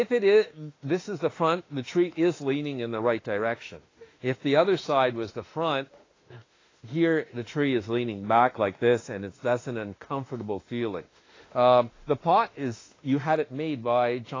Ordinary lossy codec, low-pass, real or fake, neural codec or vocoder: AAC, 32 kbps; 7.2 kHz; fake; autoencoder, 48 kHz, 32 numbers a frame, DAC-VAE, trained on Japanese speech